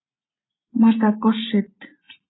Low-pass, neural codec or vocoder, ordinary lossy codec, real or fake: 7.2 kHz; none; AAC, 16 kbps; real